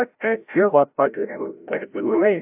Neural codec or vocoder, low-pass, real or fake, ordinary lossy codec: codec, 16 kHz, 0.5 kbps, FreqCodec, larger model; 3.6 kHz; fake; AAC, 32 kbps